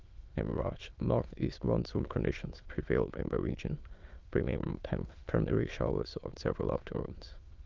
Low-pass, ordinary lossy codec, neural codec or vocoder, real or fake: 7.2 kHz; Opus, 32 kbps; autoencoder, 22.05 kHz, a latent of 192 numbers a frame, VITS, trained on many speakers; fake